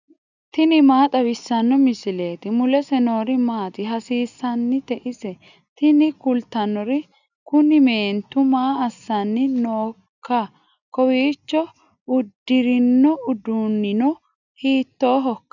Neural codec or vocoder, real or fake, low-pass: none; real; 7.2 kHz